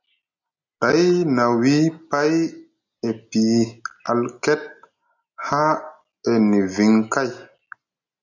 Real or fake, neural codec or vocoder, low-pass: real; none; 7.2 kHz